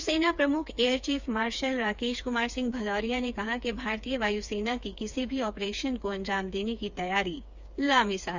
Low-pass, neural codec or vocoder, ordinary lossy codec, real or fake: 7.2 kHz; codec, 16 kHz, 4 kbps, FreqCodec, smaller model; Opus, 64 kbps; fake